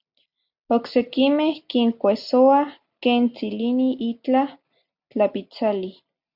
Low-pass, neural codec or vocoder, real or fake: 5.4 kHz; none; real